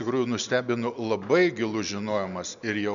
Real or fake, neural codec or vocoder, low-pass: real; none; 7.2 kHz